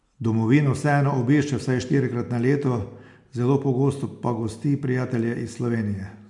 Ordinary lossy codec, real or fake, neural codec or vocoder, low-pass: MP3, 64 kbps; real; none; 10.8 kHz